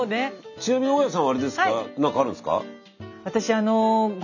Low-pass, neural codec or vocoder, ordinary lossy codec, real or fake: 7.2 kHz; none; none; real